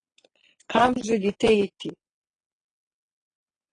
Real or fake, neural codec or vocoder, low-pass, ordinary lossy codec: fake; vocoder, 22.05 kHz, 80 mel bands, Vocos; 9.9 kHz; AAC, 32 kbps